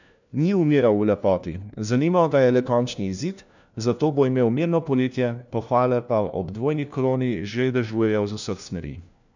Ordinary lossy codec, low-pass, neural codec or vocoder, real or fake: none; 7.2 kHz; codec, 16 kHz, 1 kbps, FunCodec, trained on LibriTTS, 50 frames a second; fake